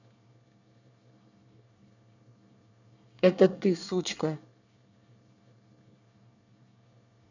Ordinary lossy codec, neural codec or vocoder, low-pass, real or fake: none; codec, 24 kHz, 1 kbps, SNAC; 7.2 kHz; fake